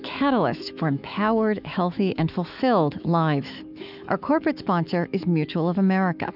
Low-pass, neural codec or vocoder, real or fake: 5.4 kHz; autoencoder, 48 kHz, 128 numbers a frame, DAC-VAE, trained on Japanese speech; fake